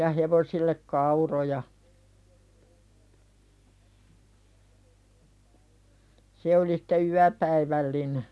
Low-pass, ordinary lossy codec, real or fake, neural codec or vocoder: none; none; real; none